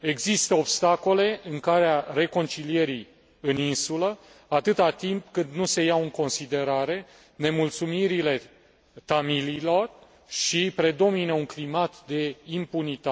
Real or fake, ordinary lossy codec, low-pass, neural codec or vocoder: real; none; none; none